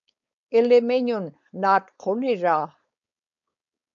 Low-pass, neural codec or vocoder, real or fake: 7.2 kHz; codec, 16 kHz, 4.8 kbps, FACodec; fake